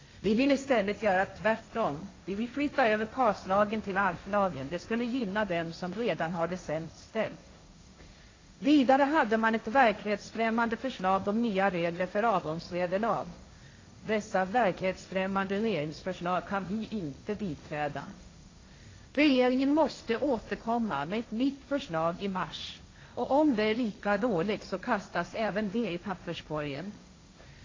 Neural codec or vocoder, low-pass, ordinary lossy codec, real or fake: codec, 16 kHz, 1.1 kbps, Voila-Tokenizer; 7.2 kHz; AAC, 32 kbps; fake